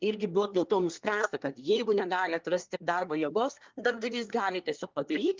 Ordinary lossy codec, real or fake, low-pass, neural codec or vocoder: Opus, 24 kbps; fake; 7.2 kHz; codec, 24 kHz, 1 kbps, SNAC